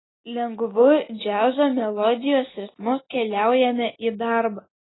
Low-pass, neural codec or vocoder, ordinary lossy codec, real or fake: 7.2 kHz; none; AAC, 16 kbps; real